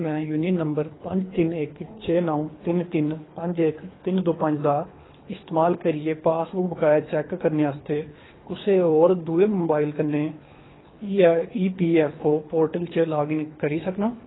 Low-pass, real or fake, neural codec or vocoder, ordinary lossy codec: 7.2 kHz; fake; codec, 24 kHz, 3 kbps, HILCodec; AAC, 16 kbps